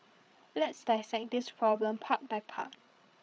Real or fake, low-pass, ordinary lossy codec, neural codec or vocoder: fake; none; none; codec, 16 kHz, 8 kbps, FreqCodec, larger model